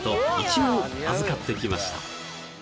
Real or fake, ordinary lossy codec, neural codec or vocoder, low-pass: real; none; none; none